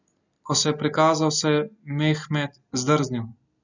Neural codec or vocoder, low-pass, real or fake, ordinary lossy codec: none; 7.2 kHz; real; none